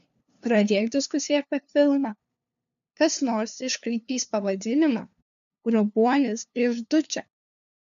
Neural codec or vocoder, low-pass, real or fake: codec, 16 kHz, 2 kbps, FunCodec, trained on LibriTTS, 25 frames a second; 7.2 kHz; fake